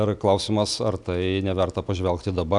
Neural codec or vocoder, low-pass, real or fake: none; 10.8 kHz; real